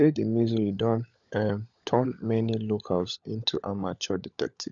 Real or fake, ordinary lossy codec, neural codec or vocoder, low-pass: fake; none; codec, 16 kHz, 16 kbps, FunCodec, trained on LibriTTS, 50 frames a second; 7.2 kHz